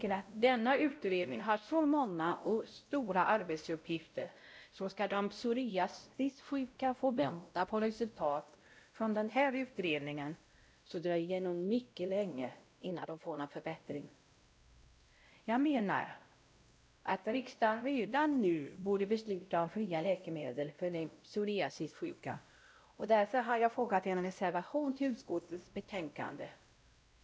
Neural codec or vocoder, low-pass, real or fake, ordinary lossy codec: codec, 16 kHz, 0.5 kbps, X-Codec, WavLM features, trained on Multilingual LibriSpeech; none; fake; none